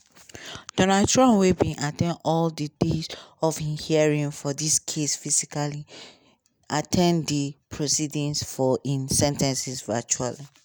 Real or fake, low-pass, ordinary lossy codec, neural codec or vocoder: real; none; none; none